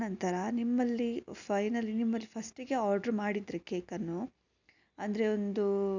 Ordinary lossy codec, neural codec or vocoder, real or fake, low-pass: none; none; real; 7.2 kHz